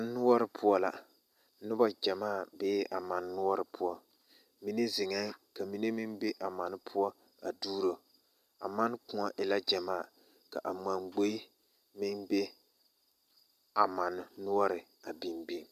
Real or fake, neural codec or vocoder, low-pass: real; none; 14.4 kHz